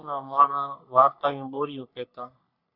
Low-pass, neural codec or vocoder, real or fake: 5.4 kHz; codec, 44.1 kHz, 3.4 kbps, Pupu-Codec; fake